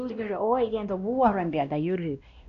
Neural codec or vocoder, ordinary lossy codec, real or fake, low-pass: codec, 16 kHz, 1 kbps, X-Codec, WavLM features, trained on Multilingual LibriSpeech; none; fake; 7.2 kHz